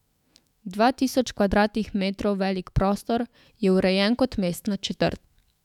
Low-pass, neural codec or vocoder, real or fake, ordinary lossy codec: 19.8 kHz; autoencoder, 48 kHz, 128 numbers a frame, DAC-VAE, trained on Japanese speech; fake; none